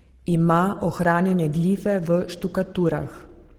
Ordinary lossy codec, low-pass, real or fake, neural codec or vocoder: Opus, 16 kbps; 19.8 kHz; fake; codec, 44.1 kHz, 7.8 kbps, Pupu-Codec